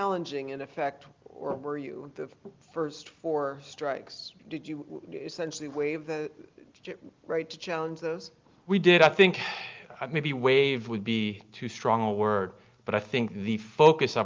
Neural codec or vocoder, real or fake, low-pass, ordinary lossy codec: none; real; 7.2 kHz; Opus, 24 kbps